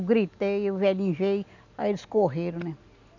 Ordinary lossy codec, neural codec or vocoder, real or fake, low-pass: none; none; real; 7.2 kHz